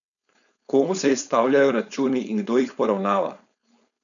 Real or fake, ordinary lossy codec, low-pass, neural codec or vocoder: fake; none; 7.2 kHz; codec, 16 kHz, 4.8 kbps, FACodec